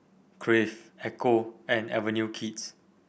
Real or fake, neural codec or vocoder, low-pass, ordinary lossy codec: real; none; none; none